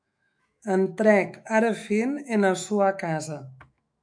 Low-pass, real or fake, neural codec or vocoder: 9.9 kHz; fake; autoencoder, 48 kHz, 128 numbers a frame, DAC-VAE, trained on Japanese speech